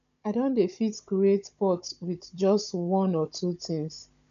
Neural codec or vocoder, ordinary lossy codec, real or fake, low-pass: codec, 16 kHz, 16 kbps, FunCodec, trained on Chinese and English, 50 frames a second; none; fake; 7.2 kHz